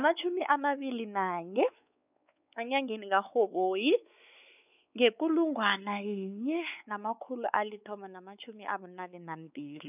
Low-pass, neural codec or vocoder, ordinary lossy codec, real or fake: 3.6 kHz; codec, 16 kHz, 4 kbps, X-Codec, WavLM features, trained on Multilingual LibriSpeech; none; fake